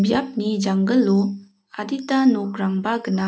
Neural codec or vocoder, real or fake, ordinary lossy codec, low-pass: none; real; none; none